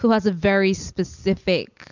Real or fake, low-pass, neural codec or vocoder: real; 7.2 kHz; none